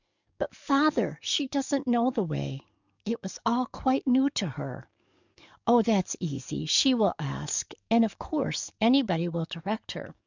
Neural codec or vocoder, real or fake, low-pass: codec, 44.1 kHz, 7.8 kbps, DAC; fake; 7.2 kHz